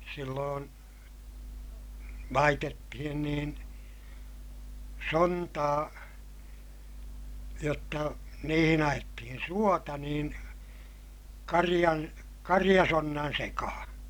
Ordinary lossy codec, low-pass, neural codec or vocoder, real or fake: none; none; none; real